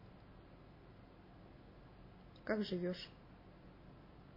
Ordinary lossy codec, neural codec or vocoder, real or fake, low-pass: MP3, 24 kbps; none; real; 5.4 kHz